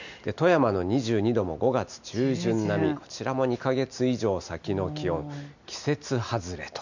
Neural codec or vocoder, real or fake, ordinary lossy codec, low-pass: none; real; none; 7.2 kHz